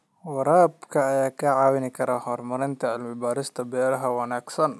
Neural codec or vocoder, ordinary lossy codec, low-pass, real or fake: none; none; none; real